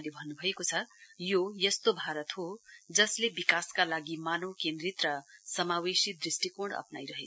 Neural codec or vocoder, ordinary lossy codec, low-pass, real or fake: none; none; none; real